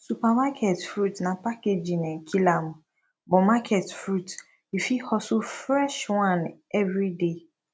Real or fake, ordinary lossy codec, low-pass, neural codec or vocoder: real; none; none; none